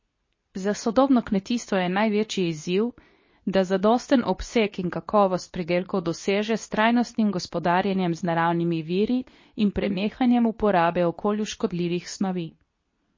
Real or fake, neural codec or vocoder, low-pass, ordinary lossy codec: fake; codec, 24 kHz, 0.9 kbps, WavTokenizer, medium speech release version 2; 7.2 kHz; MP3, 32 kbps